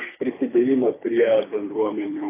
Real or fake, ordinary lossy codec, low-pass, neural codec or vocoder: fake; AAC, 16 kbps; 3.6 kHz; codec, 24 kHz, 3 kbps, HILCodec